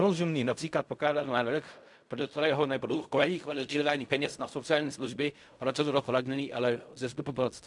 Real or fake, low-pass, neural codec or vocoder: fake; 10.8 kHz; codec, 16 kHz in and 24 kHz out, 0.4 kbps, LongCat-Audio-Codec, fine tuned four codebook decoder